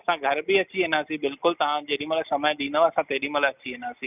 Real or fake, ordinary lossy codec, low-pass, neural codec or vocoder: real; none; 3.6 kHz; none